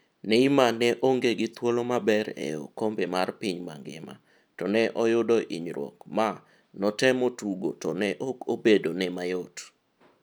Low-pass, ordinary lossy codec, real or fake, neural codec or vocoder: none; none; real; none